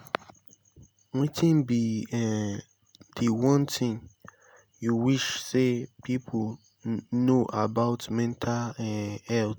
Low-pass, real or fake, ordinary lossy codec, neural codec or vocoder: none; real; none; none